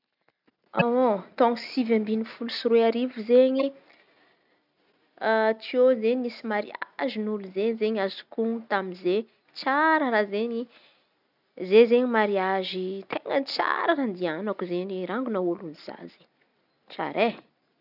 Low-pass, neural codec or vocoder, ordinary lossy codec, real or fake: 5.4 kHz; none; none; real